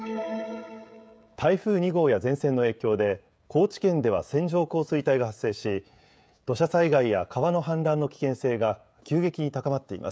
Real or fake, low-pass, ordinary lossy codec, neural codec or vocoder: fake; none; none; codec, 16 kHz, 16 kbps, FreqCodec, smaller model